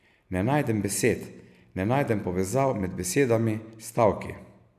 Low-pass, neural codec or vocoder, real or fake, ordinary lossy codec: 14.4 kHz; none; real; none